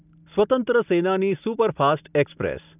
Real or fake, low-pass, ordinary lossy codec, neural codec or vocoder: real; 3.6 kHz; none; none